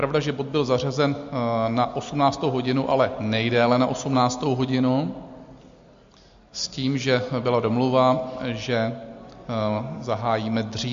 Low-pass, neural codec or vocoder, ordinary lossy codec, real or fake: 7.2 kHz; none; MP3, 48 kbps; real